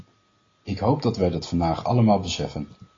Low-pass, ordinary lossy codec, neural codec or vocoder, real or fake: 7.2 kHz; AAC, 32 kbps; none; real